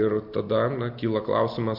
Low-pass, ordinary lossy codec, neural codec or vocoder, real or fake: 5.4 kHz; MP3, 48 kbps; none; real